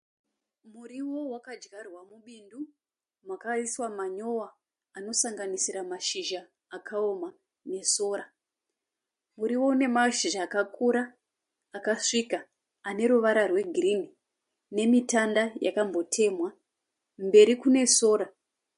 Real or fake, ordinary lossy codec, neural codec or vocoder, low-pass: real; MP3, 48 kbps; none; 10.8 kHz